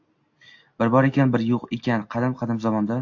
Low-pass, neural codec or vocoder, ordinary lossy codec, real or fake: 7.2 kHz; none; AAC, 48 kbps; real